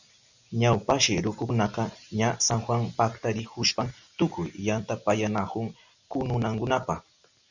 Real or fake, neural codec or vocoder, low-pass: real; none; 7.2 kHz